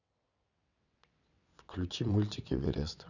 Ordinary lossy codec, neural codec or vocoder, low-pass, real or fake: none; vocoder, 22.05 kHz, 80 mel bands, WaveNeXt; 7.2 kHz; fake